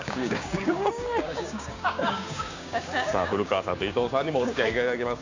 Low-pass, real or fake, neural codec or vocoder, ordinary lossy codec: 7.2 kHz; fake; codec, 16 kHz, 6 kbps, DAC; AAC, 48 kbps